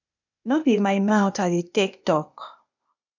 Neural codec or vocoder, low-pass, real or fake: codec, 16 kHz, 0.8 kbps, ZipCodec; 7.2 kHz; fake